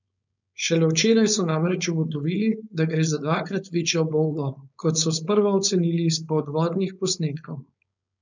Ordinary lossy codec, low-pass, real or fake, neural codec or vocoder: none; 7.2 kHz; fake; codec, 16 kHz, 4.8 kbps, FACodec